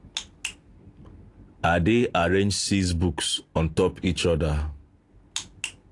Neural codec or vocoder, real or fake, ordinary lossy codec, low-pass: none; real; AAC, 48 kbps; 10.8 kHz